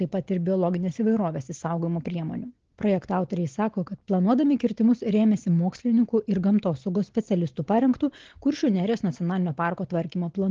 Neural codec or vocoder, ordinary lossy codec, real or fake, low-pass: none; Opus, 16 kbps; real; 7.2 kHz